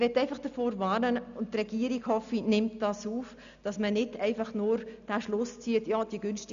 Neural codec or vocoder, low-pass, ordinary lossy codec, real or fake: none; 7.2 kHz; none; real